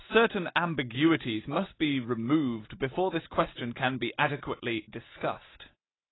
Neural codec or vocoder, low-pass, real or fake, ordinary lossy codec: none; 7.2 kHz; real; AAC, 16 kbps